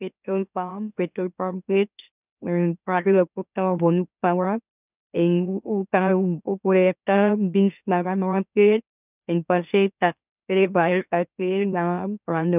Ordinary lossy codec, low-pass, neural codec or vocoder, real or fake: none; 3.6 kHz; autoencoder, 44.1 kHz, a latent of 192 numbers a frame, MeloTTS; fake